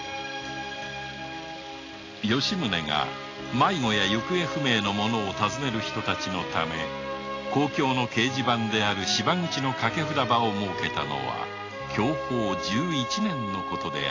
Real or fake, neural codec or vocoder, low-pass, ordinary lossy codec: real; none; 7.2 kHz; AAC, 32 kbps